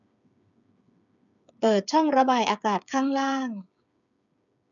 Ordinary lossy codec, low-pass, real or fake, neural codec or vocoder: none; 7.2 kHz; fake; codec, 16 kHz, 8 kbps, FreqCodec, smaller model